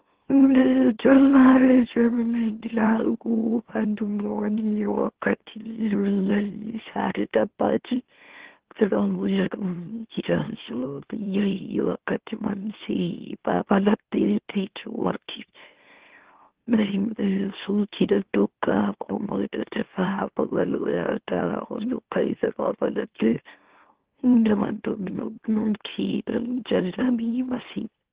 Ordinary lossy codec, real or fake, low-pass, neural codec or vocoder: Opus, 16 kbps; fake; 3.6 kHz; autoencoder, 44.1 kHz, a latent of 192 numbers a frame, MeloTTS